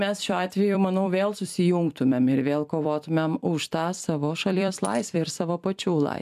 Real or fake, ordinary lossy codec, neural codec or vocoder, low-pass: fake; MP3, 96 kbps; vocoder, 44.1 kHz, 128 mel bands every 256 samples, BigVGAN v2; 14.4 kHz